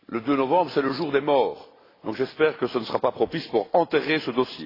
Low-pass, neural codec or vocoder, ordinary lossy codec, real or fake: 5.4 kHz; none; AAC, 24 kbps; real